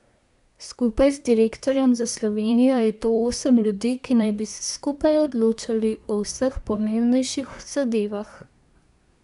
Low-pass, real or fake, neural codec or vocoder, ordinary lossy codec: 10.8 kHz; fake; codec, 24 kHz, 1 kbps, SNAC; none